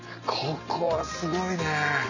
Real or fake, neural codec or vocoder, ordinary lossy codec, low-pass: real; none; none; 7.2 kHz